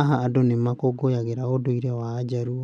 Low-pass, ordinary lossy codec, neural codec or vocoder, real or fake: 10.8 kHz; none; none; real